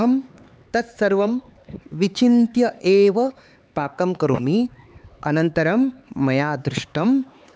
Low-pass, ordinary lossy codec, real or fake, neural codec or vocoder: none; none; fake; codec, 16 kHz, 4 kbps, X-Codec, HuBERT features, trained on LibriSpeech